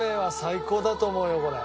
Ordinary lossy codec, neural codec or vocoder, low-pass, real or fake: none; none; none; real